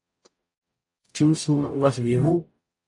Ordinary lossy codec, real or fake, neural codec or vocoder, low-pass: AAC, 48 kbps; fake; codec, 44.1 kHz, 0.9 kbps, DAC; 10.8 kHz